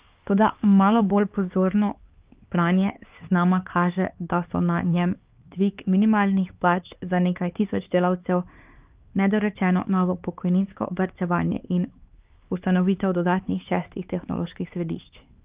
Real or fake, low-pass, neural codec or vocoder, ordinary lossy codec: fake; 3.6 kHz; codec, 16 kHz, 4 kbps, X-Codec, HuBERT features, trained on LibriSpeech; Opus, 32 kbps